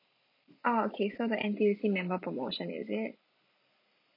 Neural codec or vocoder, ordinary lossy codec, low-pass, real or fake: vocoder, 44.1 kHz, 128 mel bands every 256 samples, BigVGAN v2; MP3, 48 kbps; 5.4 kHz; fake